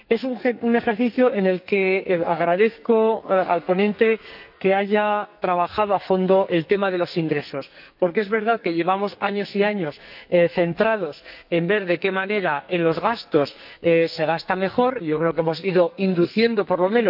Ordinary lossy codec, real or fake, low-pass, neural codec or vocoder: none; fake; 5.4 kHz; codec, 44.1 kHz, 2.6 kbps, SNAC